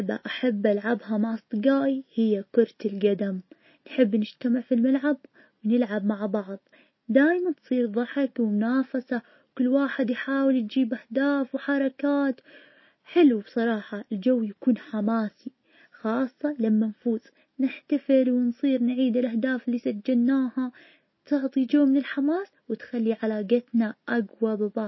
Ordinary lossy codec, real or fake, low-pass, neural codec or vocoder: MP3, 24 kbps; real; 7.2 kHz; none